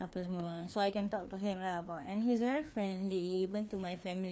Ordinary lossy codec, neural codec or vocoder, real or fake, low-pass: none; codec, 16 kHz, 2 kbps, FreqCodec, larger model; fake; none